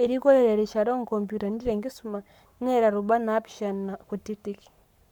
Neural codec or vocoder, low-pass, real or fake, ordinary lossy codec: codec, 44.1 kHz, 7.8 kbps, Pupu-Codec; 19.8 kHz; fake; none